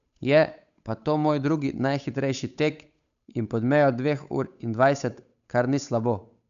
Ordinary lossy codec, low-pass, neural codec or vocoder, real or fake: none; 7.2 kHz; codec, 16 kHz, 8 kbps, FunCodec, trained on Chinese and English, 25 frames a second; fake